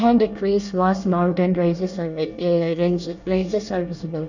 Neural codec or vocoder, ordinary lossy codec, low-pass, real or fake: codec, 24 kHz, 1 kbps, SNAC; none; 7.2 kHz; fake